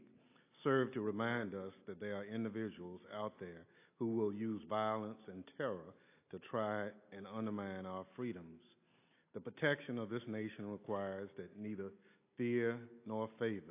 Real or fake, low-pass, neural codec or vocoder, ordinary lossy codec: real; 3.6 kHz; none; AAC, 24 kbps